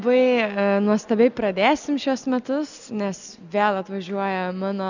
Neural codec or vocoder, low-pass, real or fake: none; 7.2 kHz; real